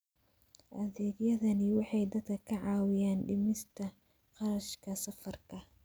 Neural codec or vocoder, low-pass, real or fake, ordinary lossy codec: none; none; real; none